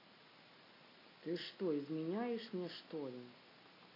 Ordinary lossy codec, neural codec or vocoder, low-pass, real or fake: AAC, 24 kbps; none; 5.4 kHz; real